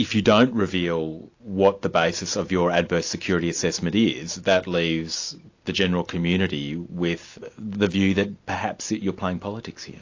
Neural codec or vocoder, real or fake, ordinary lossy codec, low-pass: none; real; AAC, 48 kbps; 7.2 kHz